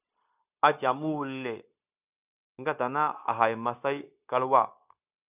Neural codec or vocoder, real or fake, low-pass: codec, 16 kHz, 0.9 kbps, LongCat-Audio-Codec; fake; 3.6 kHz